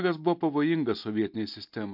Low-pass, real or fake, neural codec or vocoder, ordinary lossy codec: 5.4 kHz; real; none; MP3, 48 kbps